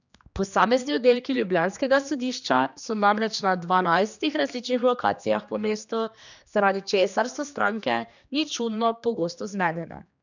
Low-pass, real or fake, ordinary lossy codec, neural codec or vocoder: 7.2 kHz; fake; none; codec, 16 kHz, 2 kbps, X-Codec, HuBERT features, trained on general audio